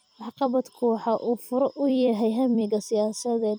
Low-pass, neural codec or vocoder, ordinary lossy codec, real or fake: none; vocoder, 44.1 kHz, 128 mel bands every 256 samples, BigVGAN v2; none; fake